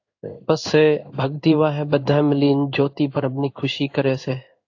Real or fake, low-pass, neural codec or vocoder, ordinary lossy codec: fake; 7.2 kHz; codec, 16 kHz in and 24 kHz out, 1 kbps, XY-Tokenizer; AAC, 48 kbps